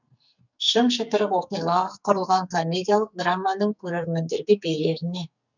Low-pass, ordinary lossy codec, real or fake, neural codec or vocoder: 7.2 kHz; none; fake; codec, 44.1 kHz, 2.6 kbps, SNAC